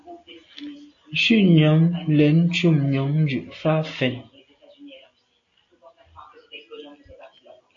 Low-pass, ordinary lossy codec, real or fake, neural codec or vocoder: 7.2 kHz; AAC, 48 kbps; real; none